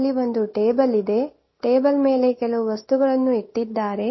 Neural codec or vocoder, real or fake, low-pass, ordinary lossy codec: none; real; 7.2 kHz; MP3, 24 kbps